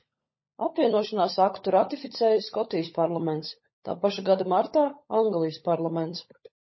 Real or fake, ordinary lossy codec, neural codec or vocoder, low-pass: fake; MP3, 24 kbps; codec, 16 kHz, 16 kbps, FunCodec, trained on LibriTTS, 50 frames a second; 7.2 kHz